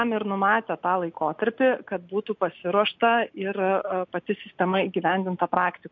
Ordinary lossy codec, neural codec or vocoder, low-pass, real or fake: MP3, 48 kbps; none; 7.2 kHz; real